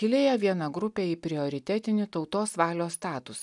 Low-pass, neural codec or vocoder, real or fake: 10.8 kHz; none; real